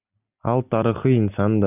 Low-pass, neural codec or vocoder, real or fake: 3.6 kHz; none; real